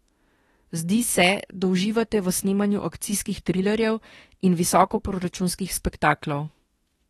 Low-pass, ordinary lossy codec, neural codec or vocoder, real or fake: 19.8 kHz; AAC, 32 kbps; autoencoder, 48 kHz, 32 numbers a frame, DAC-VAE, trained on Japanese speech; fake